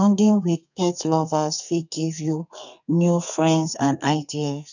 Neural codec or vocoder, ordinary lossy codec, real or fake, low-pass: codec, 32 kHz, 1.9 kbps, SNAC; none; fake; 7.2 kHz